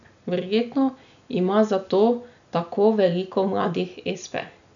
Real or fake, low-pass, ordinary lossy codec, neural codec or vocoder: real; 7.2 kHz; none; none